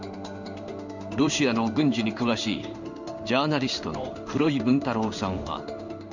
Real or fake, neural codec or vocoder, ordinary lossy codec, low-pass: fake; codec, 16 kHz in and 24 kHz out, 1 kbps, XY-Tokenizer; none; 7.2 kHz